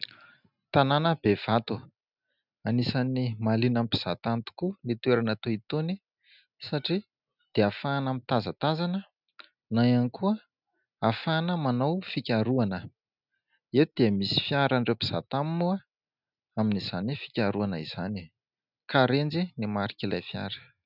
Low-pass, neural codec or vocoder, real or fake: 5.4 kHz; none; real